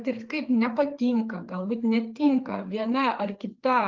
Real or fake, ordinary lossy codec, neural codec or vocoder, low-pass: fake; Opus, 24 kbps; codec, 16 kHz, 4 kbps, FreqCodec, larger model; 7.2 kHz